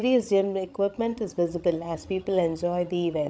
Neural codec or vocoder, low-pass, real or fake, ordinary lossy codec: codec, 16 kHz, 16 kbps, FreqCodec, larger model; none; fake; none